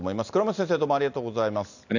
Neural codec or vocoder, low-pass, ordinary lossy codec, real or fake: none; 7.2 kHz; none; real